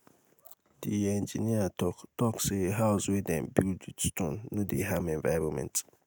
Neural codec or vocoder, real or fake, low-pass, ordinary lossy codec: none; real; none; none